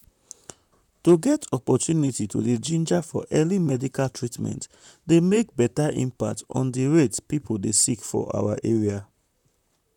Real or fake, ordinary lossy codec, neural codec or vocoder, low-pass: fake; none; vocoder, 44.1 kHz, 128 mel bands, Pupu-Vocoder; 19.8 kHz